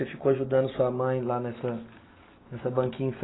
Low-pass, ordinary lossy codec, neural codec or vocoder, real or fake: 7.2 kHz; AAC, 16 kbps; none; real